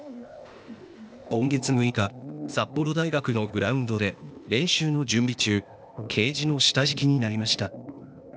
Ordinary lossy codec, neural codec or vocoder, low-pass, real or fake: none; codec, 16 kHz, 0.8 kbps, ZipCodec; none; fake